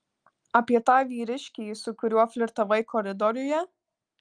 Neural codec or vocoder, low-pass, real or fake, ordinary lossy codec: none; 9.9 kHz; real; Opus, 32 kbps